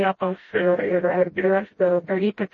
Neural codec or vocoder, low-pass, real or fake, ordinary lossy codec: codec, 16 kHz, 0.5 kbps, FreqCodec, smaller model; 7.2 kHz; fake; MP3, 32 kbps